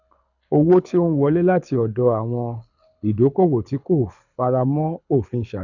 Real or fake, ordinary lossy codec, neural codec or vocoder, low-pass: fake; none; codec, 24 kHz, 6 kbps, HILCodec; 7.2 kHz